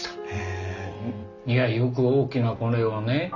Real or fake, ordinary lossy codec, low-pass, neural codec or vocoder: real; none; 7.2 kHz; none